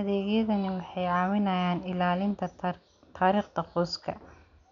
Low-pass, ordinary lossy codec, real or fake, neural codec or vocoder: 7.2 kHz; none; real; none